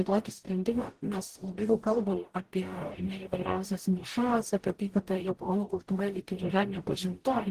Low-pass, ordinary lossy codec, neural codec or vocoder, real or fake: 14.4 kHz; Opus, 16 kbps; codec, 44.1 kHz, 0.9 kbps, DAC; fake